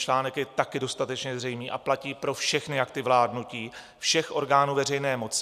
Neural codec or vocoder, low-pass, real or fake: vocoder, 44.1 kHz, 128 mel bands every 256 samples, BigVGAN v2; 14.4 kHz; fake